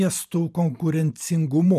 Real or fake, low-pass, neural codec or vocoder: fake; 14.4 kHz; vocoder, 44.1 kHz, 128 mel bands every 512 samples, BigVGAN v2